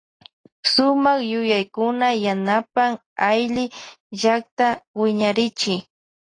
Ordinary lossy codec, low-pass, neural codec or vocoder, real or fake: AAC, 32 kbps; 9.9 kHz; none; real